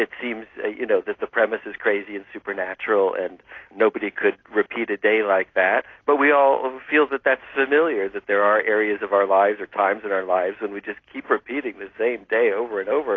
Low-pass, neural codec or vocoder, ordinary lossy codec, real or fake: 7.2 kHz; none; AAC, 32 kbps; real